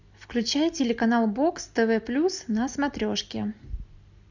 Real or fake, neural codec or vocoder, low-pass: real; none; 7.2 kHz